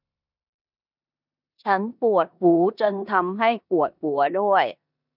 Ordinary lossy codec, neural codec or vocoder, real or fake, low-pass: none; codec, 16 kHz in and 24 kHz out, 0.9 kbps, LongCat-Audio-Codec, four codebook decoder; fake; 5.4 kHz